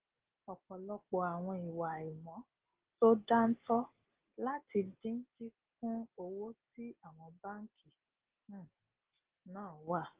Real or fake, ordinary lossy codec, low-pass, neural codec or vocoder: real; Opus, 16 kbps; 3.6 kHz; none